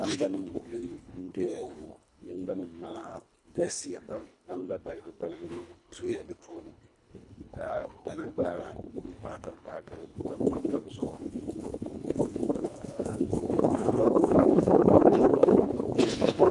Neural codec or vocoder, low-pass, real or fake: codec, 24 kHz, 1.5 kbps, HILCodec; 10.8 kHz; fake